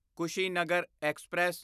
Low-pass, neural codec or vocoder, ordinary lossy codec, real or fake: 14.4 kHz; none; none; real